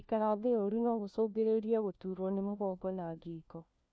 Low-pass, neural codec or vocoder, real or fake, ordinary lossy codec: none; codec, 16 kHz, 1 kbps, FunCodec, trained on LibriTTS, 50 frames a second; fake; none